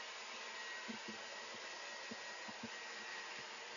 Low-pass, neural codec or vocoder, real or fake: 7.2 kHz; none; real